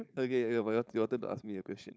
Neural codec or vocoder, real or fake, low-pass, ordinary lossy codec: codec, 16 kHz, 4.8 kbps, FACodec; fake; none; none